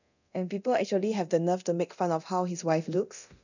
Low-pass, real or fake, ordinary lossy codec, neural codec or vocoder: 7.2 kHz; fake; none; codec, 24 kHz, 0.9 kbps, DualCodec